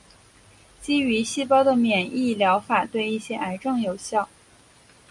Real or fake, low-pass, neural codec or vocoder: real; 10.8 kHz; none